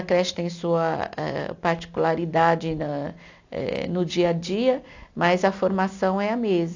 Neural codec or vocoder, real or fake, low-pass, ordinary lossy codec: none; real; 7.2 kHz; AAC, 48 kbps